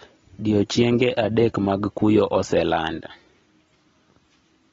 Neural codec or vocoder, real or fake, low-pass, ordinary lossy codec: none; real; 7.2 kHz; AAC, 24 kbps